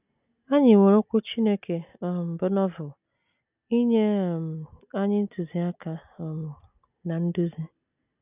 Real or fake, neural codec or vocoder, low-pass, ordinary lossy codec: real; none; 3.6 kHz; none